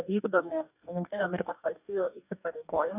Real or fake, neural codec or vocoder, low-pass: fake; codec, 44.1 kHz, 2.6 kbps, DAC; 3.6 kHz